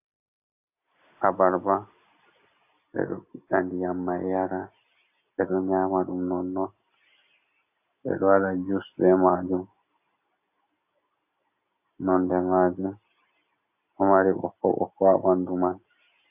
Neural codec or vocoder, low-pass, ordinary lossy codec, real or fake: none; 3.6 kHz; AAC, 32 kbps; real